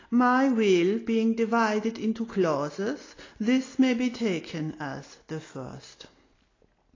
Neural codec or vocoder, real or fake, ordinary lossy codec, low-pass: none; real; AAC, 32 kbps; 7.2 kHz